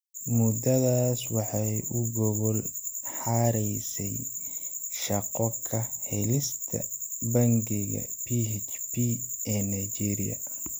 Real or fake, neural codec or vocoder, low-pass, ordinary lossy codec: real; none; none; none